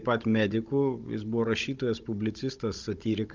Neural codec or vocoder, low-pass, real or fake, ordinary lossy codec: codec, 16 kHz, 16 kbps, FunCodec, trained on Chinese and English, 50 frames a second; 7.2 kHz; fake; Opus, 24 kbps